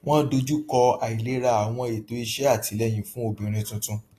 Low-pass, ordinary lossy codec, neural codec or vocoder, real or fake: 14.4 kHz; AAC, 48 kbps; none; real